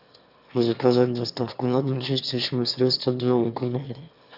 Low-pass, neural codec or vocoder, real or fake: 5.4 kHz; autoencoder, 22.05 kHz, a latent of 192 numbers a frame, VITS, trained on one speaker; fake